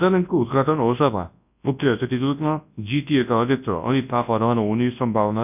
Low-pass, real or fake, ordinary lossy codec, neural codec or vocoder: 3.6 kHz; fake; none; codec, 24 kHz, 0.9 kbps, WavTokenizer, large speech release